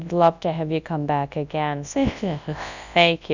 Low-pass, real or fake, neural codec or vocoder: 7.2 kHz; fake; codec, 24 kHz, 0.9 kbps, WavTokenizer, large speech release